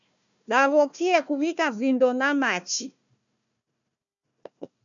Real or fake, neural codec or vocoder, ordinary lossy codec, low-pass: fake; codec, 16 kHz, 1 kbps, FunCodec, trained on Chinese and English, 50 frames a second; MP3, 96 kbps; 7.2 kHz